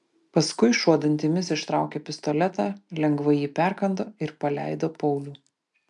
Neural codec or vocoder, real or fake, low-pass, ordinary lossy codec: none; real; 10.8 kHz; MP3, 96 kbps